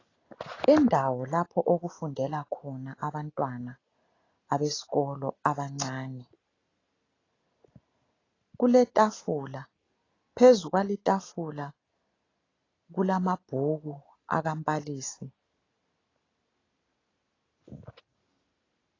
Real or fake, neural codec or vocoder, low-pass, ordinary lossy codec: real; none; 7.2 kHz; AAC, 32 kbps